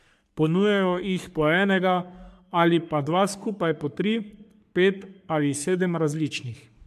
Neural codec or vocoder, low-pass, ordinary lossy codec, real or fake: codec, 44.1 kHz, 3.4 kbps, Pupu-Codec; 14.4 kHz; MP3, 96 kbps; fake